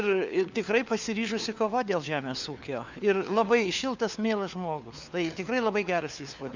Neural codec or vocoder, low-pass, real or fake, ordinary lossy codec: codec, 16 kHz, 4 kbps, FunCodec, trained on LibriTTS, 50 frames a second; 7.2 kHz; fake; Opus, 64 kbps